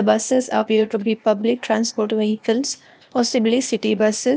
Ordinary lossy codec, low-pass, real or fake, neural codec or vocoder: none; none; fake; codec, 16 kHz, 0.8 kbps, ZipCodec